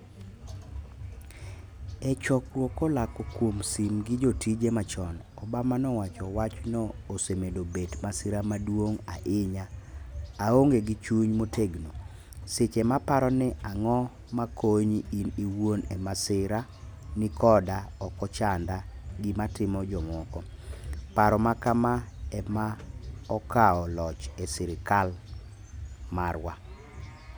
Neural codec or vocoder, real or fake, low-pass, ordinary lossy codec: none; real; none; none